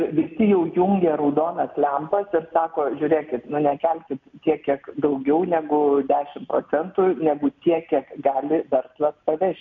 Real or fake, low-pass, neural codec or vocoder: real; 7.2 kHz; none